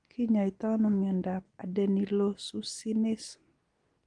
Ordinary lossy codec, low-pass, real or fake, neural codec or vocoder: Opus, 24 kbps; 9.9 kHz; real; none